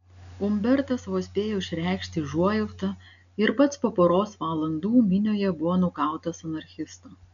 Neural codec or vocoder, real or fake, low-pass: none; real; 7.2 kHz